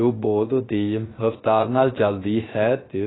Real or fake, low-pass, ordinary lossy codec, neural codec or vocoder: fake; 7.2 kHz; AAC, 16 kbps; codec, 16 kHz, 0.3 kbps, FocalCodec